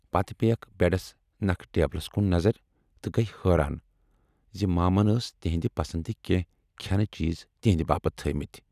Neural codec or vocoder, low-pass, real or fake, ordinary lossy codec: none; 14.4 kHz; real; none